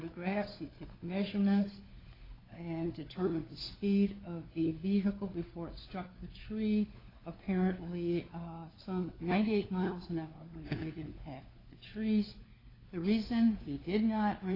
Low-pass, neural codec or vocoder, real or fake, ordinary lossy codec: 5.4 kHz; codec, 16 kHz, 4 kbps, FunCodec, trained on LibriTTS, 50 frames a second; fake; AAC, 24 kbps